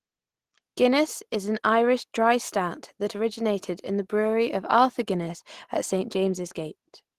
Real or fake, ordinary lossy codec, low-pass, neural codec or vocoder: real; Opus, 16 kbps; 14.4 kHz; none